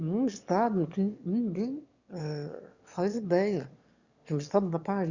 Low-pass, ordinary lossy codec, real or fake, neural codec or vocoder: 7.2 kHz; Opus, 64 kbps; fake; autoencoder, 22.05 kHz, a latent of 192 numbers a frame, VITS, trained on one speaker